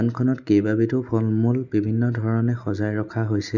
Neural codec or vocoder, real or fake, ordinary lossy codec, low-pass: none; real; none; 7.2 kHz